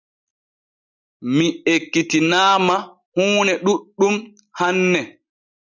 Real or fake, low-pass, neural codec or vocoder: real; 7.2 kHz; none